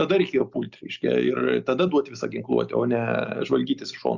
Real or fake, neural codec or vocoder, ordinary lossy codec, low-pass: real; none; Opus, 64 kbps; 7.2 kHz